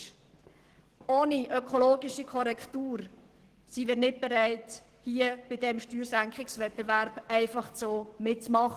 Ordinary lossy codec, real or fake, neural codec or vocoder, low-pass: Opus, 16 kbps; fake; codec, 44.1 kHz, 7.8 kbps, DAC; 14.4 kHz